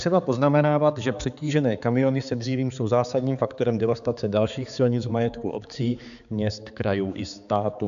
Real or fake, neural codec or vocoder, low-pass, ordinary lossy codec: fake; codec, 16 kHz, 4 kbps, X-Codec, HuBERT features, trained on balanced general audio; 7.2 kHz; AAC, 96 kbps